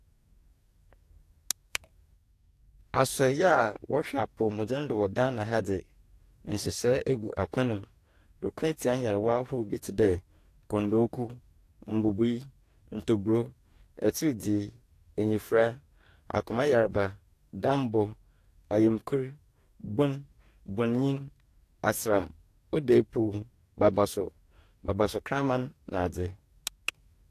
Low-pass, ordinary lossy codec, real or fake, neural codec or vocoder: 14.4 kHz; AAC, 64 kbps; fake; codec, 44.1 kHz, 2.6 kbps, DAC